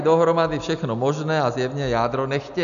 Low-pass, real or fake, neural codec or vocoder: 7.2 kHz; real; none